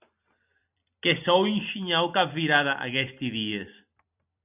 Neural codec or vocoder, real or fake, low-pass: vocoder, 44.1 kHz, 128 mel bands every 256 samples, BigVGAN v2; fake; 3.6 kHz